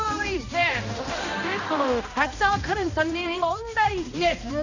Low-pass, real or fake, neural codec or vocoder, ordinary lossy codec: 7.2 kHz; fake; codec, 16 kHz, 1 kbps, X-Codec, HuBERT features, trained on balanced general audio; none